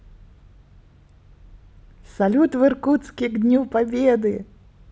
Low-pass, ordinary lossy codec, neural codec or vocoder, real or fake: none; none; none; real